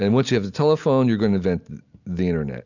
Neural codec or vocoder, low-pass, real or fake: none; 7.2 kHz; real